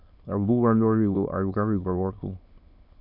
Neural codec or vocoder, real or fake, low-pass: autoencoder, 22.05 kHz, a latent of 192 numbers a frame, VITS, trained on many speakers; fake; 5.4 kHz